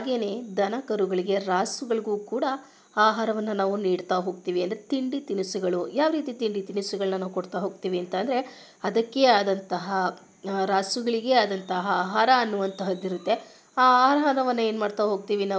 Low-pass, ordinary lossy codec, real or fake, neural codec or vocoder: none; none; real; none